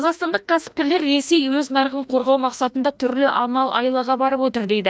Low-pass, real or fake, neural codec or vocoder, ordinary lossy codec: none; fake; codec, 16 kHz, 1 kbps, FreqCodec, larger model; none